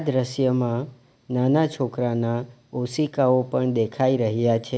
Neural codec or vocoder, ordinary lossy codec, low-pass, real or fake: none; none; none; real